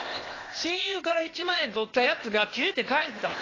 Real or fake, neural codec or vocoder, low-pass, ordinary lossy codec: fake; codec, 16 kHz, 0.8 kbps, ZipCodec; 7.2 kHz; AAC, 32 kbps